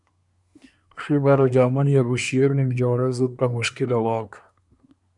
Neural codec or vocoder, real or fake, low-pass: codec, 24 kHz, 1 kbps, SNAC; fake; 10.8 kHz